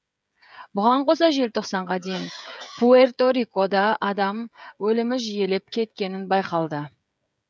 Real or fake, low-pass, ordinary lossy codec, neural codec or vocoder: fake; none; none; codec, 16 kHz, 16 kbps, FreqCodec, smaller model